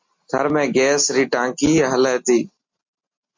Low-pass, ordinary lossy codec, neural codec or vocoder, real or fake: 7.2 kHz; MP3, 48 kbps; none; real